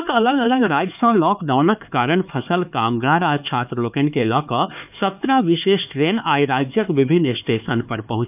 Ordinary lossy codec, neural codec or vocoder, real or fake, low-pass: none; codec, 16 kHz, 4 kbps, X-Codec, HuBERT features, trained on LibriSpeech; fake; 3.6 kHz